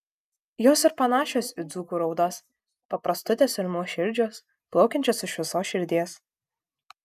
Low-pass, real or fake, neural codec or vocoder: 14.4 kHz; real; none